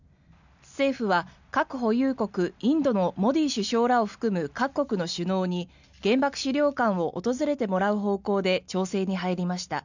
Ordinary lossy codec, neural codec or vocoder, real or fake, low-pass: none; none; real; 7.2 kHz